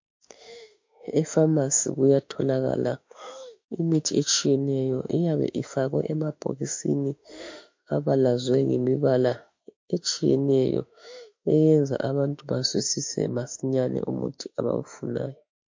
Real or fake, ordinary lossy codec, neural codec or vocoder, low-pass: fake; MP3, 48 kbps; autoencoder, 48 kHz, 32 numbers a frame, DAC-VAE, trained on Japanese speech; 7.2 kHz